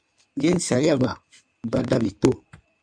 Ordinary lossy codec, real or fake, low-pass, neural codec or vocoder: MP3, 64 kbps; fake; 9.9 kHz; codec, 16 kHz in and 24 kHz out, 2.2 kbps, FireRedTTS-2 codec